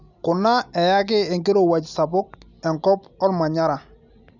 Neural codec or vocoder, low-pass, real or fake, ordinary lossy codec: none; 7.2 kHz; real; none